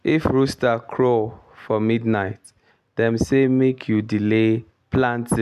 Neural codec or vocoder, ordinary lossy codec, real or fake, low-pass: none; none; real; 14.4 kHz